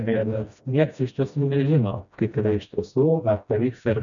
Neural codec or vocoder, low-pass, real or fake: codec, 16 kHz, 1 kbps, FreqCodec, smaller model; 7.2 kHz; fake